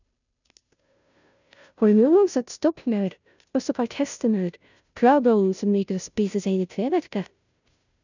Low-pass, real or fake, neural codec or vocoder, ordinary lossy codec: 7.2 kHz; fake; codec, 16 kHz, 0.5 kbps, FunCodec, trained on Chinese and English, 25 frames a second; none